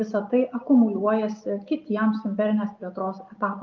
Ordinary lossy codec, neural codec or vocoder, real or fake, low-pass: Opus, 24 kbps; none; real; 7.2 kHz